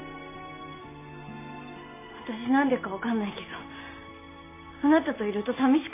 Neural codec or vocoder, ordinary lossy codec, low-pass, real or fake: none; MP3, 24 kbps; 3.6 kHz; real